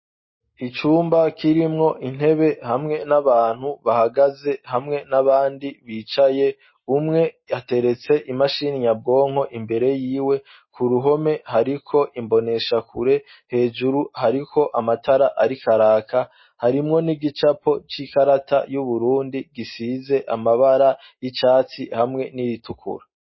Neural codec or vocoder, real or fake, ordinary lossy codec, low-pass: none; real; MP3, 24 kbps; 7.2 kHz